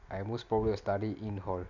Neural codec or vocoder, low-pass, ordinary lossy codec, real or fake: none; 7.2 kHz; none; real